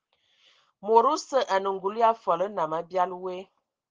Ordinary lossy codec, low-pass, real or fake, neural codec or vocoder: Opus, 16 kbps; 7.2 kHz; real; none